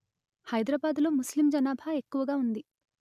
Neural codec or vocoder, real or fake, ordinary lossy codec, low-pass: none; real; none; 14.4 kHz